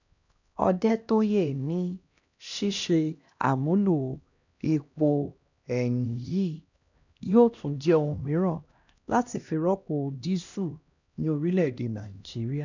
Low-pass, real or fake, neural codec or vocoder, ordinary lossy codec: 7.2 kHz; fake; codec, 16 kHz, 1 kbps, X-Codec, HuBERT features, trained on LibriSpeech; none